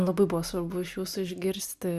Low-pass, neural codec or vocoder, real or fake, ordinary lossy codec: 14.4 kHz; none; real; Opus, 64 kbps